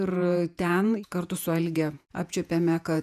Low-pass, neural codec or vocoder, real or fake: 14.4 kHz; vocoder, 48 kHz, 128 mel bands, Vocos; fake